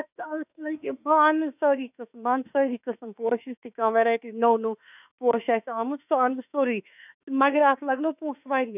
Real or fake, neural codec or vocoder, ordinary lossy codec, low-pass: fake; autoencoder, 48 kHz, 32 numbers a frame, DAC-VAE, trained on Japanese speech; none; 3.6 kHz